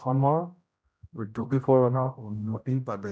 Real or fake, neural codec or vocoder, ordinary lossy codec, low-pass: fake; codec, 16 kHz, 0.5 kbps, X-Codec, HuBERT features, trained on general audio; none; none